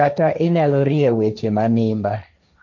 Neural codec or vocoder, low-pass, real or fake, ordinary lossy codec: codec, 16 kHz, 1.1 kbps, Voila-Tokenizer; 7.2 kHz; fake; none